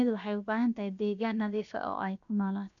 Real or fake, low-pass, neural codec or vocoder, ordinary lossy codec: fake; 7.2 kHz; codec, 16 kHz, 0.7 kbps, FocalCodec; none